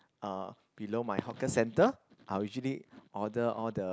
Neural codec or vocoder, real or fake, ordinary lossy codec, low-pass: none; real; none; none